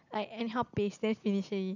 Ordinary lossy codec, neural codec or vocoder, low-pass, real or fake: none; none; 7.2 kHz; real